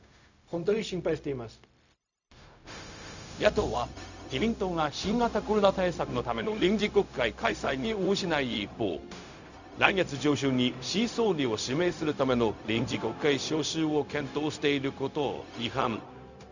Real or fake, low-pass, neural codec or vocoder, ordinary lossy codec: fake; 7.2 kHz; codec, 16 kHz, 0.4 kbps, LongCat-Audio-Codec; none